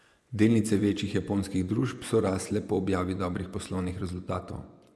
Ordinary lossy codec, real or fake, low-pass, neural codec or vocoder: none; fake; none; vocoder, 24 kHz, 100 mel bands, Vocos